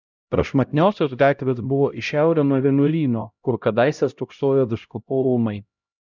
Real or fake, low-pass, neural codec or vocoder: fake; 7.2 kHz; codec, 16 kHz, 0.5 kbps, X-Codec, HuBERT features, trained on LibriSpeech